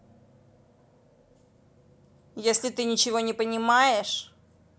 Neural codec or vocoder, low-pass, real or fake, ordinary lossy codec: none; none; real; none